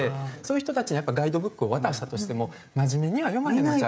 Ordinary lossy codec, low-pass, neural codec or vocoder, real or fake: none; none; codec, 16 kHz, 16 kbps, FreqCodec, smaller model; fake